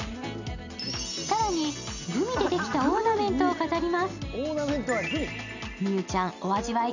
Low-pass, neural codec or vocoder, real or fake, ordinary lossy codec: 7.2 kHz; none; real; none